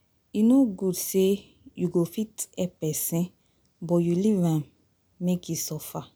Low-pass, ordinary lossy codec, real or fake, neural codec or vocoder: none; none; real; none